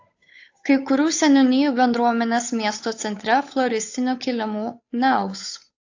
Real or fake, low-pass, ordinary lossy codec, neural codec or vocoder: fake; 7.2 kHz; AAC, 48 kbps; codec, 16 kHz, 8 kbps, FunCodec, trained on Chinese and English, 25 frames a second